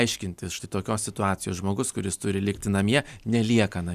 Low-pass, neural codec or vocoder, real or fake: 14.4 kHz; none; real